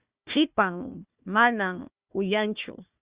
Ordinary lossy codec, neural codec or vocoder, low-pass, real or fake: Opus, 64 kbps; codec, 16 kHz, 1 kbps, FunCodec, trained on Chinese and English, 50 frames a second; 3.6 kHz; fake